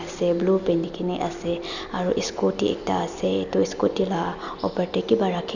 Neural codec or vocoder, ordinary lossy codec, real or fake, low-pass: none; none; real; 7.2 kHz